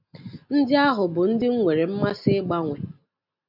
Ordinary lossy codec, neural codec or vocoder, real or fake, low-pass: AAC, 48 kbps; none; real; 5.4 kHz